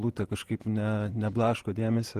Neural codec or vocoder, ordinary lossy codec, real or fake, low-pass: vocoder, 48 kHz, 128 mel bands, Vocos; Opus, 16 kbps; fake; 14.4 kHz